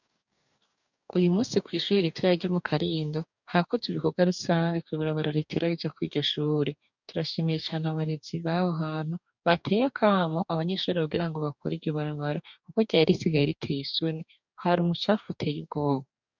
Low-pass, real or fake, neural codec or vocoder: 7.2 kHz; fake; codec, 44.1 kHz, 2.6 kbps, DAC